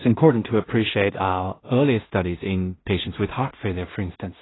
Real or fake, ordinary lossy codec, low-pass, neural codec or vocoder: fake; AAC, 16 kbps; 7.2 kHz; codec, 16 kHz in and 24 kHz out, 0.4 kbps, LongCat-Audio-Codec, two codebook decoder